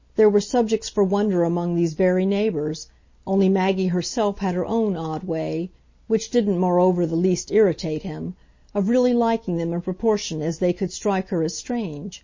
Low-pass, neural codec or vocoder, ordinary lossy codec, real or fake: 7.2 kHz; none; MP3, 32 kbps; real